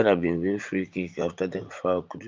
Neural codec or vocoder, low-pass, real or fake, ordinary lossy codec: vocoder, 44.1 kHz, 80 mel bands, Vocos; 7.2 kHz; fake; Opus, 32 kbps